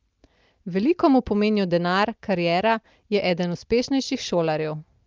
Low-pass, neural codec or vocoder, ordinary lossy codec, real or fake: 7.2 kHz; none; Opus, 24 kbps; real